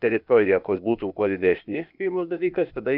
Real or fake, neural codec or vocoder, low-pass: fake; codec, 16 kHz, 0.8 kbps, ZipCodec; 5.4 kHz